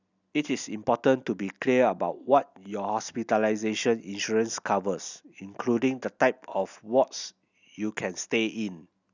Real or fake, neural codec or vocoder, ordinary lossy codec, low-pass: real; none; none; 7.2 kHz